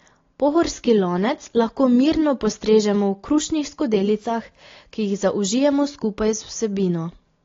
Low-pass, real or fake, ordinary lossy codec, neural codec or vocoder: 7.2 kHz; real; AAC, 32 kbps; none